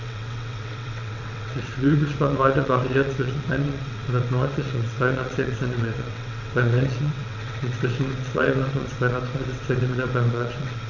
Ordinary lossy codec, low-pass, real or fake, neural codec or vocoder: none; 7.2 kHz; fake; vocoder, 22.05 kHz, 80 mel bands, WaveNeXt